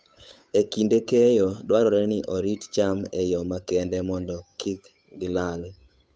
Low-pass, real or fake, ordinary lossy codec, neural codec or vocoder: none; fake; none; codec, 16 kHz, 8 kbps, FunCodec, trained on Chinese and English, 25 frames a second